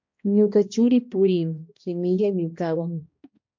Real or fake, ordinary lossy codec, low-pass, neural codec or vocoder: fake; MP3, 48 kbps; 7.2 kHz; codec, 16 kHz, 1 kbps, X-Codec, HuBERT features, trained on balanced general audio